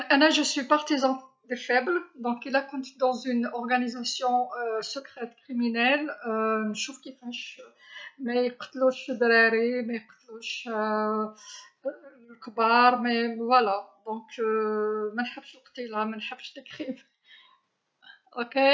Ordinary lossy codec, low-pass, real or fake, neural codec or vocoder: none; none; real; none